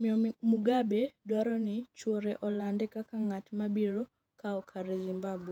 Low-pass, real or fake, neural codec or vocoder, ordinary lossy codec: 19.8 kHz; fake; vocoder, 48 kHz, 128 mel bands, Vocos; none